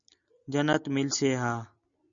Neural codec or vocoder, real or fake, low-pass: none; real; 7.2 kHz